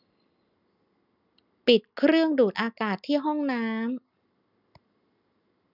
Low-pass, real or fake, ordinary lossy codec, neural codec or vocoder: 5.4 kHz; real; none; none